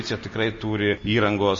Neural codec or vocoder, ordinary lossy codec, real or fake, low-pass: none; MP3, 32 kbps; real; 7.2 kHz